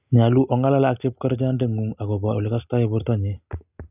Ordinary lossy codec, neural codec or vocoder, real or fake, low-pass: none; none; real; 3.6 kHz